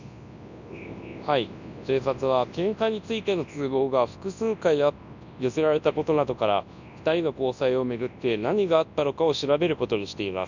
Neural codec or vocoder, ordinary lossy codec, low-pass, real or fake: codec, 24 kHz, 0.9 kbps, WavTokenizer, large speech release; none; 7.2 kHz; fake